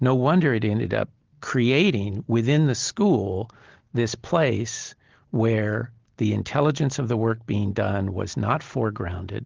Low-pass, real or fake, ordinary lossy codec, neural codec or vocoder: 7.2 kHz; real; Opus, 16 kbps; none